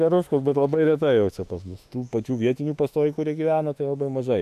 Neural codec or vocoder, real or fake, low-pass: autoencoder, 48 kHz, 32 numbers a frame, DAC-VAE, trained on Japanese speech; fake; 14.4 kHz